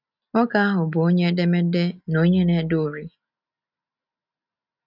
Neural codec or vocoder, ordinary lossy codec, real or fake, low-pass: none; none; real; 5.4 kHz